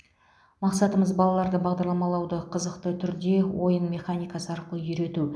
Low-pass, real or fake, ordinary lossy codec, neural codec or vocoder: none; real; none; none